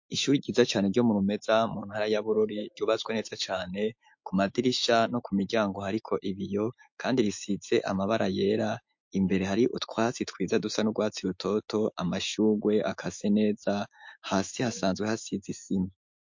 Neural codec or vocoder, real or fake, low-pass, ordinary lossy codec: autoencoder, 48 kHz, 128 numbers a frame, DAC-VAE, trained on Japanese speech; fake; 7.2 kHz; MP3, 48 kbps